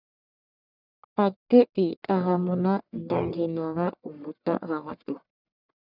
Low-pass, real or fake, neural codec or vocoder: 5.4 kHz; fake; codec, 44.1 kHz, 1.7 kbps, Pupu-Codec